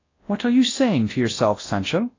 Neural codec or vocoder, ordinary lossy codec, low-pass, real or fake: codec, 24 kHz, 0.9 kbps, WavTokenizer, large speech release; AAC, 32 kbps; 7.2 kHz; fake